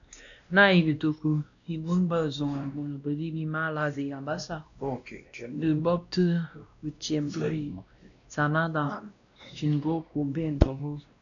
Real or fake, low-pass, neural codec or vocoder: fake; 7.2 kHz; codec, 16 kHz, 1 kbps, X-Codec, WavLM features, trained on Multilingual LibriSpeech